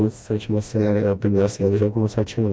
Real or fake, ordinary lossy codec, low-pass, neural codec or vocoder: fake; none; none; codec, 16 kHz, 1 kbps, FreqCodec, smaller model